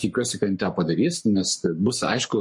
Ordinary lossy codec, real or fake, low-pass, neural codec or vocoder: MP3, 48 kbps; fake; 10.8 kHz; codec, 44.1 kHz, 7.8 kbps, Pupu-Codec